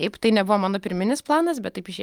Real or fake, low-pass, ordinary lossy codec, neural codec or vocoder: real; 19.8 kHz; Opus, 32 kbps; none